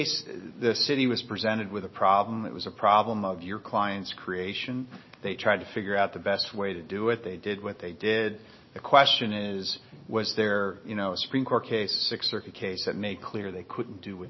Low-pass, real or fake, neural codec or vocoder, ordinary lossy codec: 7.2 kHz; real; none; MP3, 24 kbps